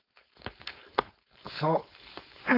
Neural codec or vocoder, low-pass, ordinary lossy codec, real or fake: codec, 16 kHz, 4.8 kbps, FACodec; 5.4 kHz; AAC, 32 kbps; fake